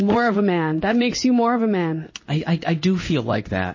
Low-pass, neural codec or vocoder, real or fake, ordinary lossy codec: 7.2 kHz; codec, 16 kHz in and 24 kHz out, 1 kbps, XY-Tokenizer; fake; MP3, 32 kbps